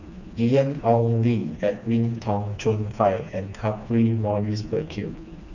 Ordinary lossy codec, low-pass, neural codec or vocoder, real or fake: none; 7.2 kHz; codec, 16 kHz, 2 kbps, FreqCodec, smaller model; fake